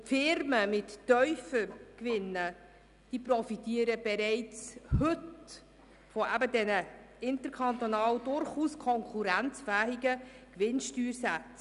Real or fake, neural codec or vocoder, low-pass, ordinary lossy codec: real; none; 10.8 kHz; none